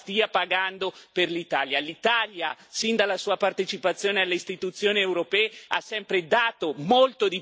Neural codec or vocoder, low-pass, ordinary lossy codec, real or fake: none; none; none; real